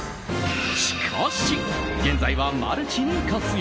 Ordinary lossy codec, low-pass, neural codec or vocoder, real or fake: none; none; none; real